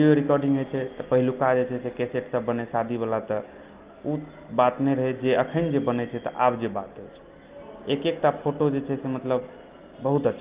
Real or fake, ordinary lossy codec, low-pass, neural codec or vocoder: real; Opus, 32 kbps; 3.6 kHz; none